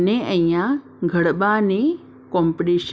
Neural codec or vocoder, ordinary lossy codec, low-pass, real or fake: none; none; none; real